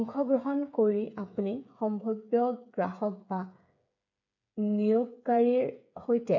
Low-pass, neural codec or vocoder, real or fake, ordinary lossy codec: 7.2 kHz; codec, 16 kHz, 8 kbps, FreqCodec, smaller model; fake; none